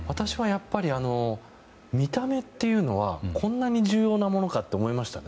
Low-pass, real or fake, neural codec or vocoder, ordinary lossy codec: none; real; none; none